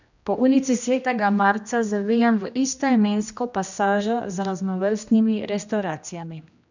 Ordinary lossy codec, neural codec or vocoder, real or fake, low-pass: none; codec, 16 kHz, 1 kbps, X-Codec, HuBERT features, trained on general audio; fake; 7.2 kHz